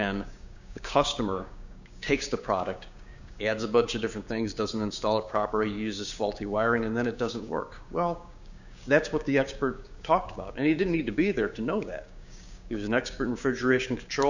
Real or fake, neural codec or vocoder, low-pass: fake; codec, 16 kHz, 6 kbps, DAC; 7.2 kHz